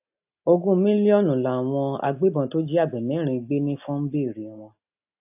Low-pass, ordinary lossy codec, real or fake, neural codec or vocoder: 3.6 kHz; AAC, 32 kbps; real; none